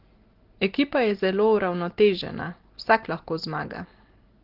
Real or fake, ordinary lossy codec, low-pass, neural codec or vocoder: real; Opus, 16 kbps; 5.4 kHz; none